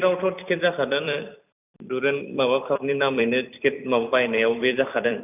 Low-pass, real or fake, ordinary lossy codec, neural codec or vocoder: 3.6 kHz; fake; none; vocoder, 44.1 kHz, 128 mel bands every 256 samples, BigVGAN v2